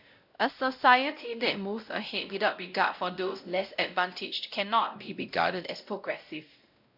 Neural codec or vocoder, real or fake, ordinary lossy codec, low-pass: codec, 16 kHz, 0.5 kbps, X-Codec, WavLM features, trained on Multilingual LibriSpeech; fake; AAC, 48 kbps; 5.4 kHz